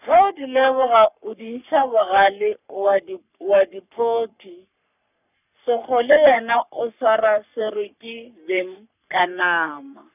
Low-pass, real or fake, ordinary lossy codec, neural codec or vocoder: 3.6 kHz; fake; none; codec, 44.1 kHz, 3.4 kbps, Pupu-Codec